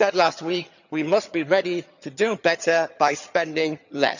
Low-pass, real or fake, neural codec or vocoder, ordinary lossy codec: 7.2 kHz; fake; vocoder, 22.05 kHz, 80 mel bands, HiFi-GAN; none